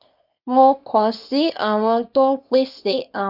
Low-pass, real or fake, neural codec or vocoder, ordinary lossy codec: 5.4 kHz; fake; codec, 24 kHz, 0.9 kbps, WavTokenizer, small release; none